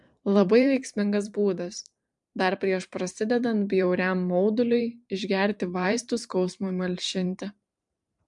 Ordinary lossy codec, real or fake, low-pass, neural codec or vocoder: MP3, 64 kbps; fake; 10.8 kHz; vocoder, 44.1 kHz, 128 mel bands every 512 samples, BigVGAN v2